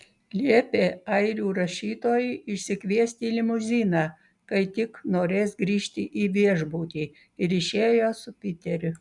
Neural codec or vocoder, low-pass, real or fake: none; 10.8 kHz; real